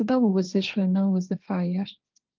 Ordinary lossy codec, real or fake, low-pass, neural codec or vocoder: Opus, 24 kbps; fake; 7.2 kHz; codec, 16 kHz, 1.1 kbps, Voila-Tokenizer